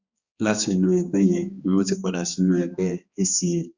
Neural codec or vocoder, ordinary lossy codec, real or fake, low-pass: codec, 16 kHz, 2 kbps, X-Codec, HuBERT features, trained on balanced general audio; Opus, 64 kbps; fake; 7.2 kHz